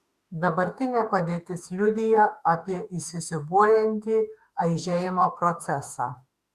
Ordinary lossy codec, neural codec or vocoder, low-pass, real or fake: Opus, 64 kbps; autoencoder, 48 kHz, 32 numbers a frame, DAC-VAE, trained on Japanese speech; 14.4 kHz; fake